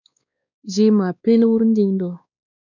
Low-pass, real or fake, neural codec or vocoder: 7.2 kHz; fake; codec, 16 kHz, 2 kbps, X-Codec, WavLM features, trained on Multilingual LibriSpeech